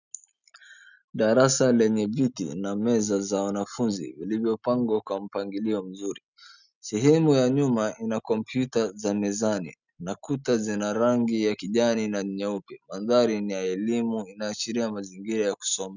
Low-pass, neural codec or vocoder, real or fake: 7.2 kHz; none; real